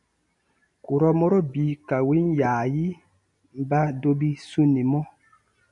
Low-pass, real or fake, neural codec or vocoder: 10.8 kHz; real; none